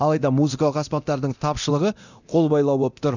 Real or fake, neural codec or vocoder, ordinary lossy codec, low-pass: fake; codec, 24 kHz, 0.9 kbps, DualCodec; none; 7.2 kHz